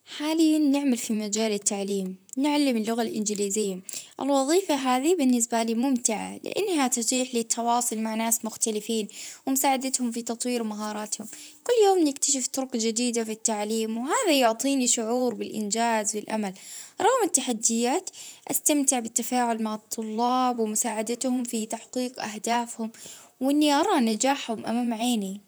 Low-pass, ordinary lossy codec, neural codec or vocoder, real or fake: none; none; vocoder, 44.1 kHz, 128 mel bands, Pupu-Vocoder; fake